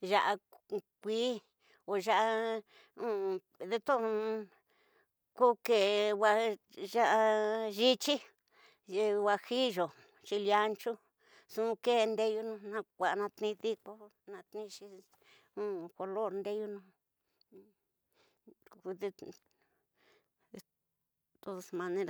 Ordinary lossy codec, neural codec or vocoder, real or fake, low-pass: none; none; real; none